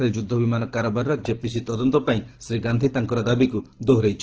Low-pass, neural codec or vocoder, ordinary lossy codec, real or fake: 7.2 kHz; codec, 24 kHz, 6 kbps, HILCodec; Opus, 16 kbps; fake